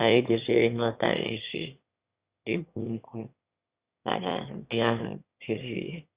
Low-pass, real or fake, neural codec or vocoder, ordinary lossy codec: 3.6 kHz; fake; autoencoder, 22.05 kHz, a latent of 192 numbers a frame, VITS, trained on one speaker; Opus, 64 kbps